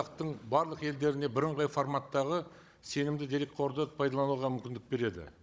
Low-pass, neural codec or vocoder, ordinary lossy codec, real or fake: none; codec, 16 kHz, 16 kbps, FunCodec, trained on Chinese and English, 50 frames a second; none; fake